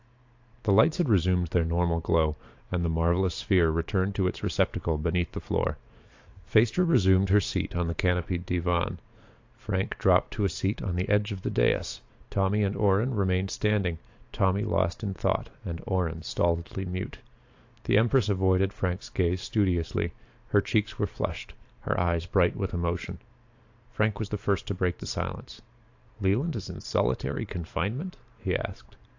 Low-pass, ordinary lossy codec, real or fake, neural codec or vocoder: 7.2 kHz; AAC, 48 kbps; fake; vocoder, 44.1 kHz, 128 mel bands every 512 samples, BigVGAN v2